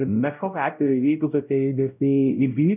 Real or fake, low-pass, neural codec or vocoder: fake; 3.6 kHz; codec, 16 kHz, 0.5 kbps, X-Codec, WavLM features, trained on Multilingual LibriSpeech